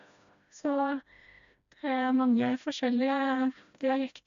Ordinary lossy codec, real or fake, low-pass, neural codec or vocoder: AAC, 96 kbps; fake; 7.2 kHz; codec, 16 kHz, 1 kbps, FreqCodec, smaller model